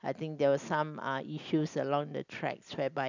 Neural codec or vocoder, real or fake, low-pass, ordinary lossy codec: none; real; 7.2 kHz; none